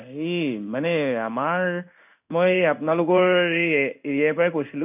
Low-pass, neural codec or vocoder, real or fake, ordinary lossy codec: 3.6 kHz; codec, 16 kHz in and 24 kHz out, 1 kbps, XY-Tokenizer; fake; none